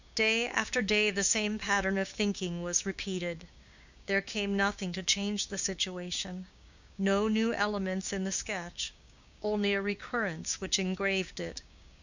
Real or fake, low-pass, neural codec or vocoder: fake; 7.2 kHz; codec, 16 kHz, 6 kbps, DAC